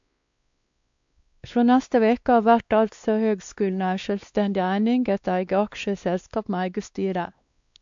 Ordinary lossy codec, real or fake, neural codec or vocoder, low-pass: AAC, 64 kbps; fake; codec, 16 kHz, 2 kbps, X-Codec, WavLM features, trained on Multilingual LibriSpeech; 7.2 kHz